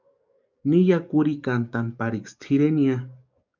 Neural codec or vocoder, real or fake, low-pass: codec, 44.1 kHz, 7.8 kbps, DAC; fake; 7.2 kHz